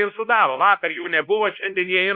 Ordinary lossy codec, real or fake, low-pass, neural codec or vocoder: Opus, 64 kbps; fake; 5.4 kHz; codec, 16 kHz, 1 kbps, X-Codec, WavLM features, trained on Multilingual LibriSpeech